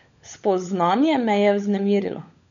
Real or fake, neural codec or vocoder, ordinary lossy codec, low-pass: fake; codec, 16 kHz, 16 kbps, FunCodec, trained on LibriTTS, 50 frames a second; none; 7.2 kHz